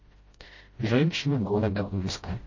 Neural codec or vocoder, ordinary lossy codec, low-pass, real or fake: codec, 16 kHz, 0.5 kbps, FreqCodec, smaller model; MP3, 32 kbps; 7.2 kHz; fake